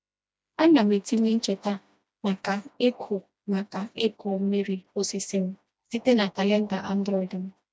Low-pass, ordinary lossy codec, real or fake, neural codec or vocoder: none; none; fake; codec, 16 kHz, 1 kbps, FreqCodec, smaller model